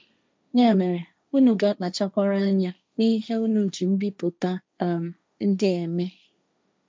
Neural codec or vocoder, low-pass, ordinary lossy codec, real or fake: codec, 16 kHz, 1.1 kbps, Voila-Tokenizer; none; none; fake